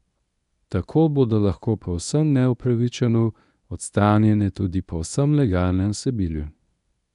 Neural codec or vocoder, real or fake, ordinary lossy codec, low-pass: codec, 24 kHz, 0.9 kbps, WavTokenizer, medium speech release version 1; fake; none; 10.8 kHz